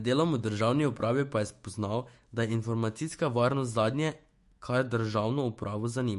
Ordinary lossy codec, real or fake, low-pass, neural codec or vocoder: MP3, 48 kbps; fake; 14.4 kHz; autoencoder, 48 kHz, 128 numbers a frame, DAC-VAE, trained on Japanese speech